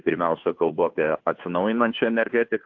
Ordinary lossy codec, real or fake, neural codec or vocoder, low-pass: AAC, 48 kbps; fake; codec, 16 kHz, 2 kbps, FunCodec, trained on Chinese and English, 25 frames a second; 7.2 kHz